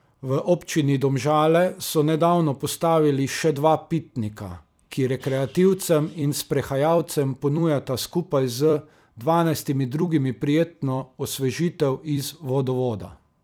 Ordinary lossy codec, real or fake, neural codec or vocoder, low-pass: none; fake; vocoder, 44.1 kHz, 128 mel bands every 256 samples, BigVGAN v2; none